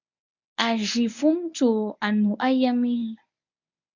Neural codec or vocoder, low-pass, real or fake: codec, 24 kHz, 0.9 kbps, WavTokenizer, medium speech release version 1; 7.2 kHz; fake